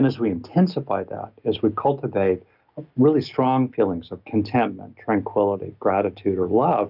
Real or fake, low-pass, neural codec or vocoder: real; 5.4 kHz; none